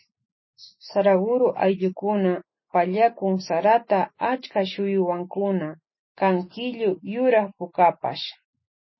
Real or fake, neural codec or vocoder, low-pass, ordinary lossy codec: real; none; 7.2 kHz; MP3, 24 kbps